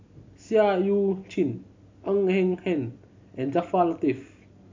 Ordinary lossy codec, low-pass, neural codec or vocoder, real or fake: MP3, 48 kbps; 7.2 kHz; none; real